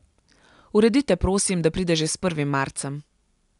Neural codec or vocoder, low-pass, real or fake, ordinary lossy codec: none; 10.8 kHz; real; none